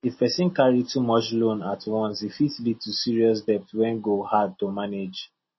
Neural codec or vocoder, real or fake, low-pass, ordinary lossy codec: none; real; 7.2 kHz; MP3, 24 kbps